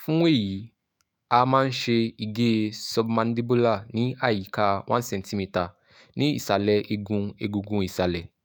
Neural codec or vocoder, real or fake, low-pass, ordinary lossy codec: autoencoder, 48 kHz, 128 numbers a frame, DAC-VAE, trained on Japanese speech; fake; none; none